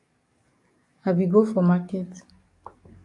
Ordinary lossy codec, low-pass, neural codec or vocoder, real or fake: AAC, 48 kbps; 10.8 kHz; codec, 44.1 kHz, 7.8 kbps, DAC; fake